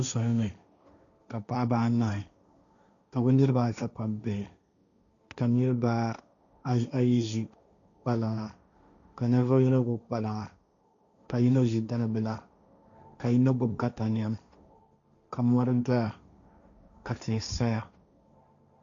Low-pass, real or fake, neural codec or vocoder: 7.2 kHz; fake; codec, 16 kHz, 1.1 kbps, Voila-Tokenizer